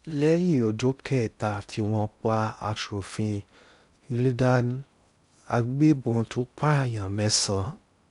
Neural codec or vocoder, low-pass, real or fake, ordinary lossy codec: codec, 16 kHz in and 24 kHz out, 0.6 kbps, FocalCodec, streaming, 2048 codes; 10.8 kHz; fake; MP3, 96 kbps